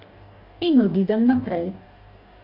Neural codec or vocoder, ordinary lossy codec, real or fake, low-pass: codec, 44.1 kHz, 2.6 kbps, DAC; AAC, 32 kbps; fake; 5.4 kHz